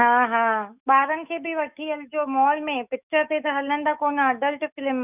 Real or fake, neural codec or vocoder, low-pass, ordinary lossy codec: fake; autoencoder, 48 kHz, 128 numbers a frame, DAC-VAE, trained on Japanese speech; 3.6 kHz; none